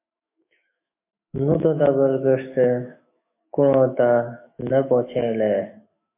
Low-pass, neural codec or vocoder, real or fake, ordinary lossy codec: 3.6 kHz; autoencoder, 48 kHz, 128 numbers a frame, DAC-VAE, trained on Japanese speech; fake; MP3, 24 kbps